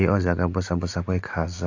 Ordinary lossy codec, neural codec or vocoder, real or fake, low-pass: AAC, 48 kbps; none; real; 7.2 kHz